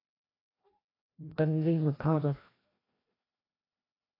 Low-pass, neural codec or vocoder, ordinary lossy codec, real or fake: 5.4 kHz; codec, 16 kHz, 1 kbps, FreqCodec, larger model; AAC, 24 kbps; fake